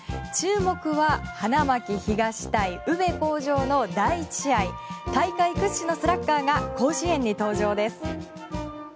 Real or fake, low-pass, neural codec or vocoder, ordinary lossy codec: real; none; none; none